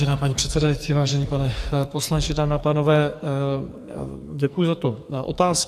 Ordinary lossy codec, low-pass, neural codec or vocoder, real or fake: AAC, 96 kbps; 14.4 kHz; codec, 44.1 kHz, 2.6 kbps, SNAC; fake